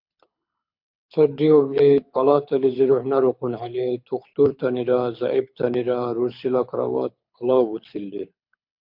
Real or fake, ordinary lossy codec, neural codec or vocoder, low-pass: fake; MP3, 48 kbps; codec, 24 kHz, 6 kbps, HILCodec; 5.4 kHz